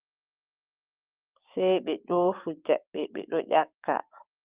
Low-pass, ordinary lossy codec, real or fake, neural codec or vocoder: 3.6 kHz; Opus, 32 kbps; fake; codec, 16 kHz, 6 kbps, DAC